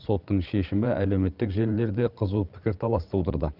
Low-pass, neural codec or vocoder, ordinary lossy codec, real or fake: 5.4 kHz; codec, 16 kHz, 16 kbps, FreqCodec, larger model; Opus, 32 kbps; fake